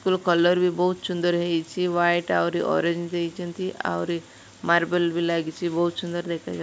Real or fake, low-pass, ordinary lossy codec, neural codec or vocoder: real; none; none; none